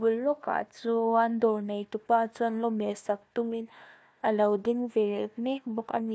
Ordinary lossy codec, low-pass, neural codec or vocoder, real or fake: none; none; codec, 16 kHz, 1 kbps, FunCodec, trained on Chinese and English, 50 frames a second; fake